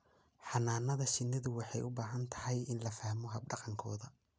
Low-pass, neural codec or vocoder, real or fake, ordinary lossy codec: none; none; real; none